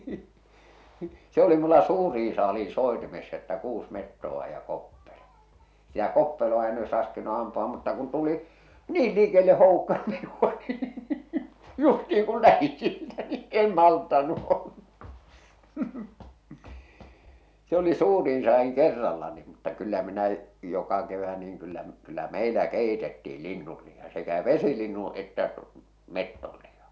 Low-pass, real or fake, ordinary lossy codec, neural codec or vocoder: none; real; none; none